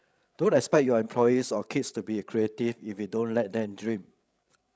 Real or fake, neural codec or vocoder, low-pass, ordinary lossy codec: fake; codec, 16 kHz, 16 kbps, FreqCodec, larger model; none; none